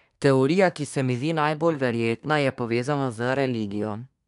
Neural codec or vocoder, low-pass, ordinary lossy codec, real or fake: codec, 24 kHz, 1 kbps, SNAC; 10.8 kHz; none; fake